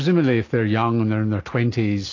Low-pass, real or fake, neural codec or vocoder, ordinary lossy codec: 7.2 kHz; real; none; AAC, 32 kbps